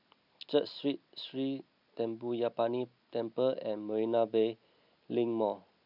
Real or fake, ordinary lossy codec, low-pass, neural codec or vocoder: real; none; 5.4 kHz; none